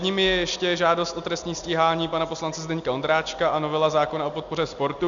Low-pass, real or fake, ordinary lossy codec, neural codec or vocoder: 7.2 kHz; real; MP3, 96 kbps; none